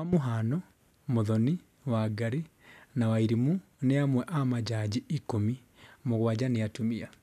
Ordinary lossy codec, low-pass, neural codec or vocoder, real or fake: none; 14.4 kHz; none; real